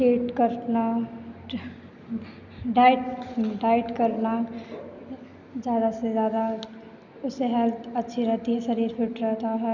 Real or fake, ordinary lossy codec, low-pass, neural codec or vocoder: real; none; 7.2 kHz; none